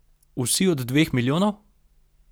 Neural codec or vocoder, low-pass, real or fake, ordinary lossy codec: none; none; real; none